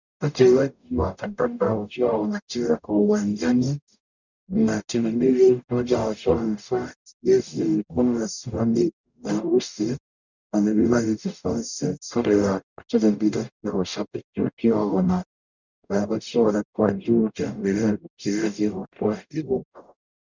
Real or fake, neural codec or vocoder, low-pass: fake; codec, 44.1 kHz, 0.9 kbps, DAC; 7.2 kHz